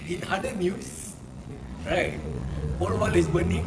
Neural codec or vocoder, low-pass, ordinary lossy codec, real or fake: vocoder, 22.05 kHz, 80 mel bands, Vocos; none; none; fake